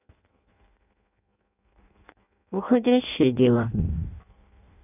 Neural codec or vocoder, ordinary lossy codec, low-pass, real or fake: codec, 16 kHz in and 24 kHz out, 0.6 kbps, FireRedTTS-2 codec; none; 3.6 kHz; fake